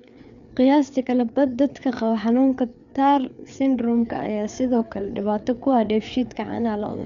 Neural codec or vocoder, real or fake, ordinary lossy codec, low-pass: codec, 16 kHz, 4 kbps, FreqCodec, larger model; fake; none; 7.2 kHz